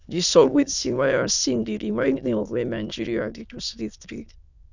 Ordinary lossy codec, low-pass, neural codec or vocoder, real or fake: none; 7.2 kHz; autoencoder, 22.05 kHz, a latent of 192 numbers a frame, VITS, trained on many speakers; fake